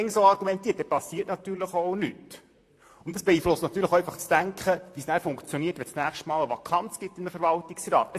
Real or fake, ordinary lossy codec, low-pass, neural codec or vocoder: fake; AAC, 64 kbps; 14.4 kHz; vocoder, 44.1 kHz, 128 mel bands, Pupu-Vocoder